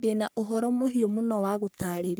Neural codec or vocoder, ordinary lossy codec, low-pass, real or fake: codec, 44.1 kHz, 3.4 kbps, Pupu-Codec; none; none; fake